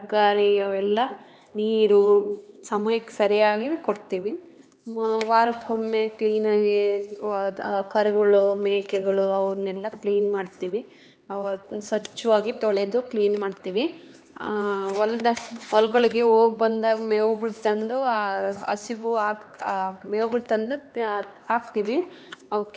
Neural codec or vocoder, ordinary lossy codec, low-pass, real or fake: codec, 16 kHz, 2 kbps, X-Codec, HuBERT features, trained on LibriSpeech; none; none; fake